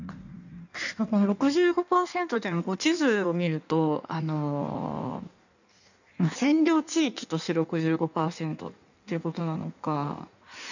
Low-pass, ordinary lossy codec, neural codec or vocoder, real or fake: 7.2 kHz; none; codec, 16 kHz in and 24 kHz out, 1.1 kbps, FireRedTTS-2 codec; fake